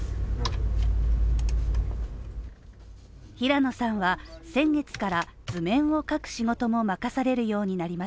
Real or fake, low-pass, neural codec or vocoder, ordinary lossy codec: real; none; none; none